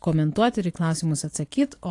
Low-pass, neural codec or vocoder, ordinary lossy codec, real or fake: 10.8 kHz; none; AAC, 48 kbps; real